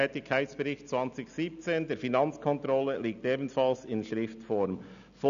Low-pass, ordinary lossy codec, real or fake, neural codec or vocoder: 7.2 kHz; none; real; none